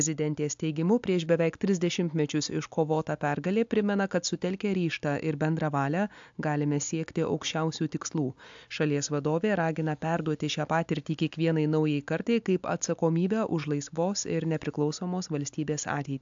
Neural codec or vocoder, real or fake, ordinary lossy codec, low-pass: none; real; AAC, 64 kbps; 7.2 kHz